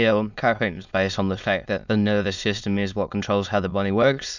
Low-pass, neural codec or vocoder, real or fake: 7.2 kHz; autoencoder, 22.05 kHz, a latent of 192 numbers a frame, VITS, trained on many speakers; fake